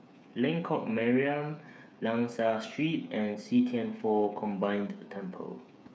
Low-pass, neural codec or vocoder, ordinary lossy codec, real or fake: none; codec, 16 kHz, 8 kbps, FreqCodec, smaller model; none; fake